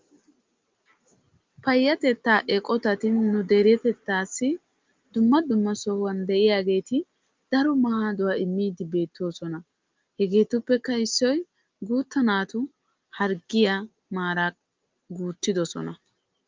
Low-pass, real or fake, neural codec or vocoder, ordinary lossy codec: 7.2 kHz; real; none; Opus, 24 kbps